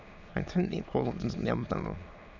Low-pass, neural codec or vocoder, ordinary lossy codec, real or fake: 7.2 kHz; autoencoder, 22.05 kHz, a latent of 192 numbers a frame, VITS, trained on many speakers; none; fake